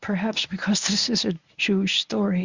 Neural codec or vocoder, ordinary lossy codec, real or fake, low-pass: codec, 24 kHz, 0.9 kbps, WavTokenizer, medium speech release version 1; Opus, 64 kbps; fake; 7.2 kHz